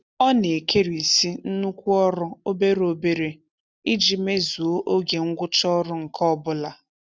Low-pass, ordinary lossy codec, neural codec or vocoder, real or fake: none; none; none; real